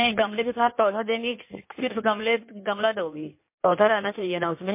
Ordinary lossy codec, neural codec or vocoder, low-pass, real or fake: MP3, 24 kbps; codec, 16 kHz in and 24 kHz out, 1.1 kbps, FireRedTTS-2 codec; 3.6 kHz; fake